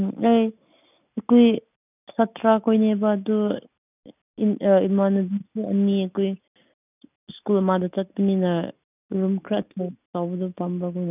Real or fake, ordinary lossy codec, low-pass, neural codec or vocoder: real; none; 3.6 kHz; none